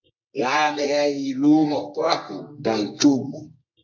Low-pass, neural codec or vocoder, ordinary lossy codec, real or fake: 7.2 kHz; codec, 24 kHz, 0.9 kbps, WavTokenizer, medium music audio release; MP3, 48 kbps; fake